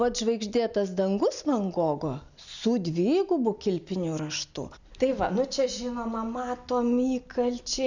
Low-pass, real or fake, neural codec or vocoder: 7.2 kHz; real; none